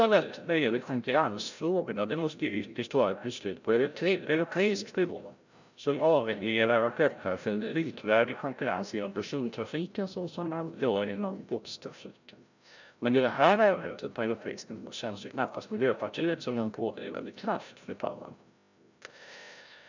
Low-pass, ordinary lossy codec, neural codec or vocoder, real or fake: 7.2 kHz; none; codec, 16 kHz, 0.5 kbps, FreqCodec, larger model; fake